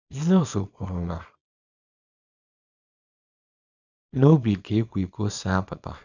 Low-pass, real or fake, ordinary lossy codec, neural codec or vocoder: 7.2 kHz; fake; none; codec, 24 kHz, 0.9 kbps, WavTokenizer, small release